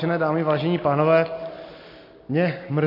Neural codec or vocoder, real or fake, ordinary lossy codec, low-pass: none; real; MP3, 32 kbps; 5.4 kHz